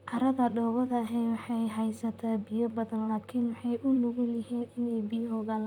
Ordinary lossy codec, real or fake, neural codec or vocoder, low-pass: none; fake; vocoder, 44.1 kHz, 128 mel bands, Pupu-Vocoder; 19.8 kHz